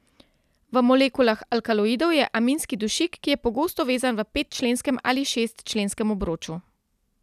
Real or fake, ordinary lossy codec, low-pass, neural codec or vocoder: real; none; 14.4 kHz; none